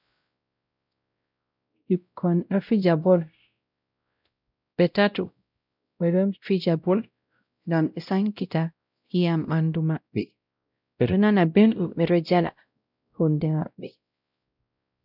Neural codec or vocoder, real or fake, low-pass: codec, 16 kHz, 0.5 kbps, X-Codec, WavLM features, trained on Multilingual LibriSpeech; fake; 5.4 kHz